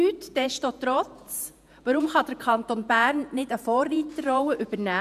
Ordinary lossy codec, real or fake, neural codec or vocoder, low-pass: none; fake; vocoder, 48 kHz, 128 mel bands, Vocos; 14.4 kHz